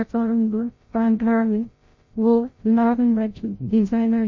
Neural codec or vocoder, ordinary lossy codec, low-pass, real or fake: codec, 16 kHz, 0.5 kbps, FreqCodec, larger model; MP3, 32 kbps; 7.2 kHz; fake